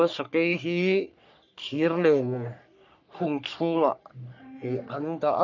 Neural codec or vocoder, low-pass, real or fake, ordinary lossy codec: codec, 44.1 kHz, 3.4 kbps, Pupu-Codec; 7.2 kHz; fake; none